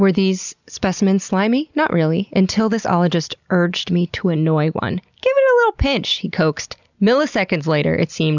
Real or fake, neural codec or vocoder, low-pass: real; none; 7.2 kHz